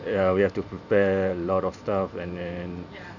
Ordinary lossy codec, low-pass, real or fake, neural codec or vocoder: none; 7.2 kHz; fake; vocoder, 44.1 kHz, 128 mel bands every 512 samples, BigVGAN v2